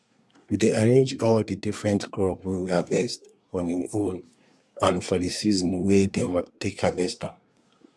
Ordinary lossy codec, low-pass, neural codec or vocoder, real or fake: none; none; codec, 24 kHz, 1 kbps, SNAC; fake